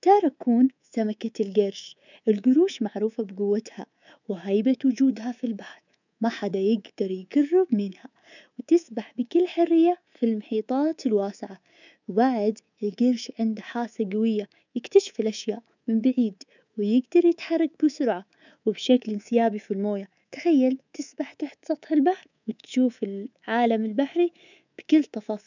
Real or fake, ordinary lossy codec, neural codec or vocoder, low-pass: fake; none; codec, 24 kHz, 3.1 kbps, DualCodec; 7.2 kHz